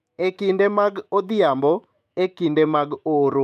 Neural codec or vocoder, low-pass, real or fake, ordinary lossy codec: autoencoder, 48 kHz, 128 numbers a frame, DAC-VAE, trained on Japanese speech; 14.4 kHz; fake; none